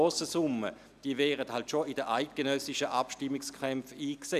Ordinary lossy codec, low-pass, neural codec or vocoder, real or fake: none; 14.4 kHz; none; real